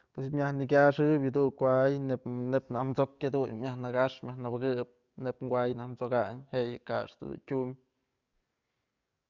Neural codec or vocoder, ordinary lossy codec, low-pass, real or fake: codec, 44.1 kHz, 7.8 kbps, DAC; Opus, 64 kbps; 7.2 kHz; fake